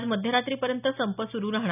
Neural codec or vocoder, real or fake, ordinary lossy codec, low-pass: none; real; none; 3.6 kHz